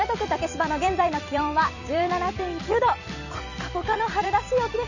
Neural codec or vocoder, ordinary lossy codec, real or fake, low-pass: vocoder, 44.1 kHz, 128 mel bands every 256 samples, BigVGAN v2; none; fake; 7.2 kHz